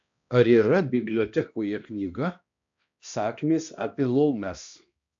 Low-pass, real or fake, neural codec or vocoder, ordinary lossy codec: 7.2 kHz; fake; codec, 16 kHz, 1 kbps, X-Codec, HuBERT features, trained on balanced general audio; AAC, 64 kbps